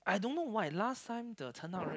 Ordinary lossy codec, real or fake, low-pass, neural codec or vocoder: none; real; none; none